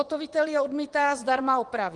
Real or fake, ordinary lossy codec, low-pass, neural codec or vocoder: fake; Opus, 24 kbps; 10.8 kHz; vocoder, 24 kHz, 100 mel bands, Vocos